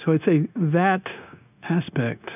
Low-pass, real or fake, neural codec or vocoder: 3.6 kHz; fake; codec, 16 kHz, 0.9 kbps, LongCat-Audio-Codec